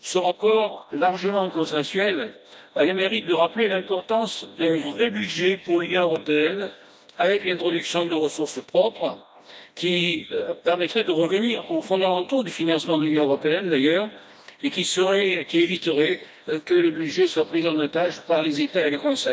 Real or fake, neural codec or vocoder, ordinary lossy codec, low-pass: fake; codec, 16 kHz, 1 kbps, FreqCodec, smaller model; none; none